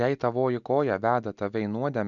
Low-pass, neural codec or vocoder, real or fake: 7.2 kHz; none; real